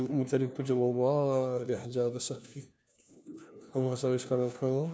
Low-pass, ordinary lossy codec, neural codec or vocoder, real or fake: none; none; codec, 16 kHz, 1 kbps, FunCodec, trained on LibriTTS, 50 frames a second; fake